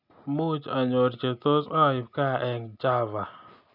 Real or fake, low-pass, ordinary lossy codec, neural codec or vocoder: real; 5.4 kHz; none; none